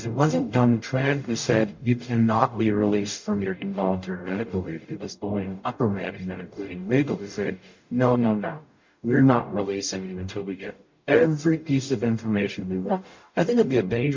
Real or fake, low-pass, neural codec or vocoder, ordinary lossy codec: fake; 7.2 kHz; codec, 44.1 kHz, 0.9 kbps, DAC; MP3, 48 kbps